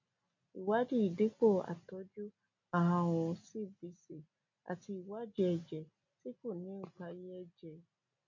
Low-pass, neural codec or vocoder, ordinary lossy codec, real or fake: 7.2 kHz; none; MP3, 48 kbps; real